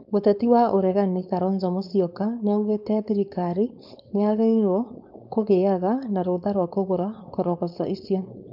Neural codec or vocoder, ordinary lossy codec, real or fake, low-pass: codec, 16 kHz, 4.8 kbps, FACodec; none; fake; 5.4 kHz